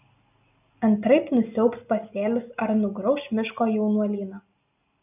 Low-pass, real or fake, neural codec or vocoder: 3.6 kHz; real; none